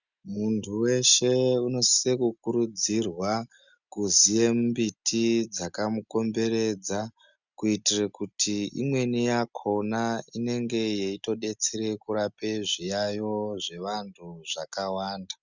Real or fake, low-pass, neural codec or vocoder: real; 7.2 kHz; none